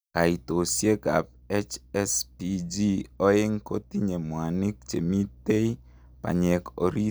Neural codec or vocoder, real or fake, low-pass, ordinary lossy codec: none; real; none; none